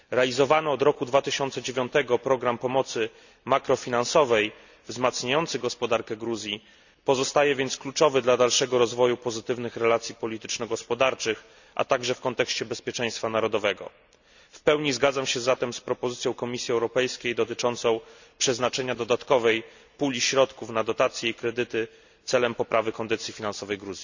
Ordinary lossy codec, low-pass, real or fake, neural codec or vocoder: none; 7.2 kHz; real; none